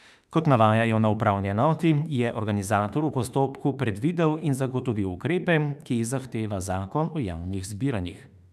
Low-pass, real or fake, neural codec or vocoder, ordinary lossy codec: 14.4 kHz; fake; autoencoder, 48 kHz, 32 numbers a frame, DAC-VAE, trained on Japanese speech; none